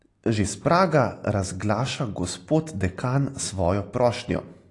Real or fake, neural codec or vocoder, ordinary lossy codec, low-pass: real; none; AAC, 48 kbps; 10.8 kHz